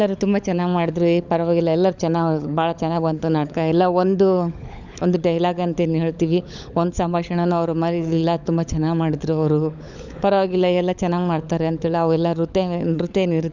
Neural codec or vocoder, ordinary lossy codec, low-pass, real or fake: codec, 16 kHz, 16 kbps, FunCodec, trained on LibriTTS, 50 frames a second; none; 7.2 kHz; fake